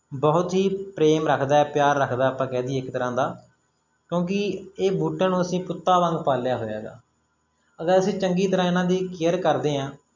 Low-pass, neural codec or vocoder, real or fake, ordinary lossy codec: 7.2 kHz; none; real; MP3, 64 kbps